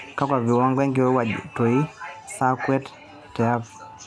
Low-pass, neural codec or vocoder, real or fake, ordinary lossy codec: none; none; real; none